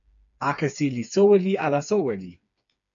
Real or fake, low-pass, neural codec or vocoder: fake; 7.2 kHz; codec, 16 kHz, 4 kbps, FreqCodec, smaller model